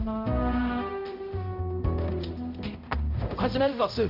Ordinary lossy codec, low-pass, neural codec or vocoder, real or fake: none; 5.4 kHz; codec, 16 kHz, 0.5 kbps, X-Codec, HuBERT features, trained on balanced general audio; fake